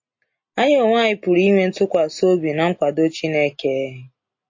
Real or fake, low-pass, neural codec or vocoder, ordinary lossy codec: real; 7.2 kHz; none; MP3, 32 kbps